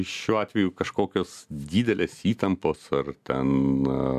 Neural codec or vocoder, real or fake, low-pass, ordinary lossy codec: none; real; 14.4 kHz; MP3, 96 kbps